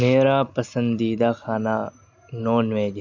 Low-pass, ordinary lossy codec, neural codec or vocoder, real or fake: 7.2 kHz; none; none; real